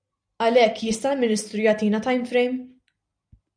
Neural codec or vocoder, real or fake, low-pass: none; real; 9.9 kHz